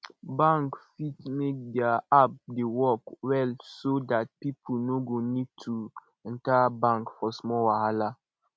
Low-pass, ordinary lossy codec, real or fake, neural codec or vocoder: none; none; real; none